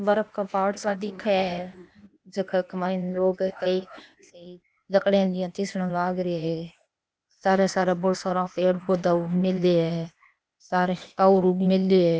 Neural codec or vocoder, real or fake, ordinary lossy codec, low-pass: codec, 16 kHz, 0.8 kbps, ZipCodec; fake; none; none